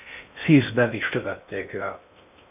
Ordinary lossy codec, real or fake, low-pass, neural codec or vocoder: MP3, 32 kbps; fake; 3.6 kHz; codec, 16 kHz in and 24 kHz out, 0.6 kbps, FocalCodec, streaming, 2048 codes